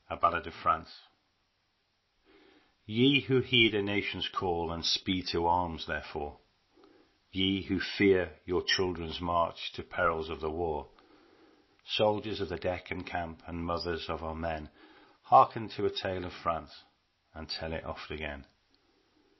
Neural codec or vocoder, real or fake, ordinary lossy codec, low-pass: none; real; MP3, 24 kbps; 7.2 kHz